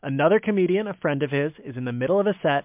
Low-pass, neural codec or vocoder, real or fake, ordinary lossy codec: 3.6 kHz; none; real; MP3, 32 kbps